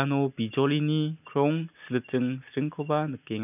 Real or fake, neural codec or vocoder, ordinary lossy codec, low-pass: real; none; none; 3.6 kHz